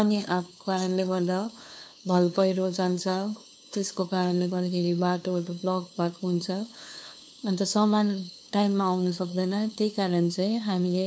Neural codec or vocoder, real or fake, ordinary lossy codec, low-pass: codec, 16 kHz, 2 kbps, FunCodec, trained on LibriTTS, 25 frames a second; fake; none; none